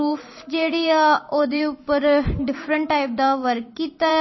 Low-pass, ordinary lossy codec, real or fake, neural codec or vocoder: 7.2 kHz; MP3, 24 kbps; fake; vocoder, 44.1 kHz, 128 mel bands every 512 samples, BigVGAN v2